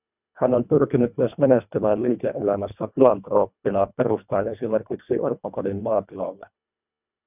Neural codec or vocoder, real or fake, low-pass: codec, 24 kHz, 1.5 kbps, HILCodec; fake; 3.6 kHz